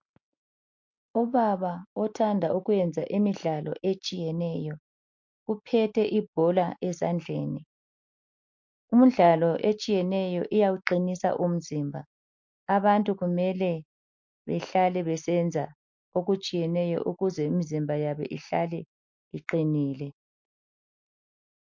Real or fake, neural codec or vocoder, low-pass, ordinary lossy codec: real; none; 7.2 kHz; MP3, 48 kbps